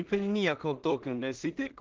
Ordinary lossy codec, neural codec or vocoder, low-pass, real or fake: Opus, 16 kbps; codec, 16 kHz in and 24 kHz out, 0.4 kbps, LongCat-Audio-Codec, two codebook decoder; 7.2 kHz; fake